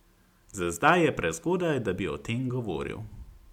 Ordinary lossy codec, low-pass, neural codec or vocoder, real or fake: MP3, 96 kbps; 19.8 kHz; none; real